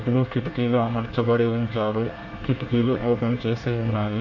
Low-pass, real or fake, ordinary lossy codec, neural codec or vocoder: 7.2 kHz; fake; AAC, 48 kbps; codec, 24 kHz, 1 kbps, SNAC